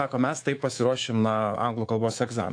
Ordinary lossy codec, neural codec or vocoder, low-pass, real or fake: AAC, 48 kbps; codec, 24 kHz, 3.1 kbps, DualCodec; 9.9 kHz; fake